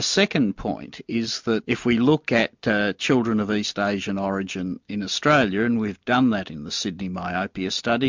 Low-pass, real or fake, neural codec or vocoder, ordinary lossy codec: 7.2 kHz; real; none; MP3, 64 kbps